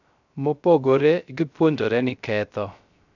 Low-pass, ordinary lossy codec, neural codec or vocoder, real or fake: 7.2 kHz; none; codec, 16 kHz, 0.3 kbps, FocalCodec; fake